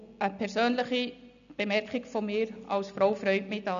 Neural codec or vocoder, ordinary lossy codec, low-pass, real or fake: none; none; 7.2 kHz; real